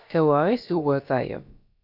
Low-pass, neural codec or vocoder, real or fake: 5.4 kHz; codec, 16 kHz, about 1 kbps, DyCAST, with the encoder's durations; fake